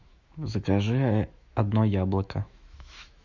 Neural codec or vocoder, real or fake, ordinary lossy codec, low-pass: autoencoder, 48 kHz, 128 numbers a frame, DAC-VAE, trained on Japanese speech; fake; Opus, 64 kbps; 7.2 kHz